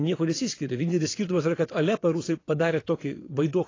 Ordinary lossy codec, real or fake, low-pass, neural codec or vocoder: AAC, 32 kbps; fake; 7.2 kHz; vocoder, 22.05 kHz, 80 mel bands, WaveNeXt